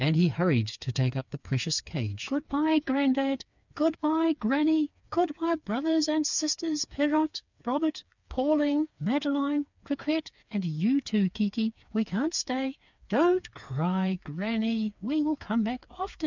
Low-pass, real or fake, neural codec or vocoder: 7.2 kHz; fake; codec, 16 kHz, 4 kbps, FreqCodec, smaller model